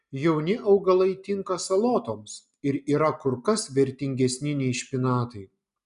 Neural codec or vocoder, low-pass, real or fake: none; 10.8 kHz; real